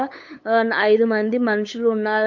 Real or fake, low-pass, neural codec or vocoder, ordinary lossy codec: fake; 7.2 kHz; codec, 16 kHz, 8 kbps, FunCodec, trained on LibriTTS, 25 frames a second; none